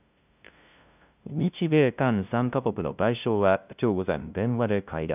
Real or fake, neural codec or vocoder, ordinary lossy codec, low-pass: fake; codec, 16 kHz, 0.5 kbps, FunCodec, trained on LibriTTS, 25 frames a second; none; 3.6 kHz